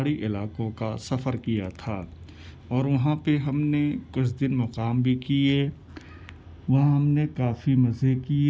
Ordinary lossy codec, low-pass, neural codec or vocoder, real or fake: none; none; none; real